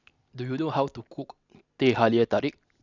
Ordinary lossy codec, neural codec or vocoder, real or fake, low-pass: none; none; real; 7.2 kHz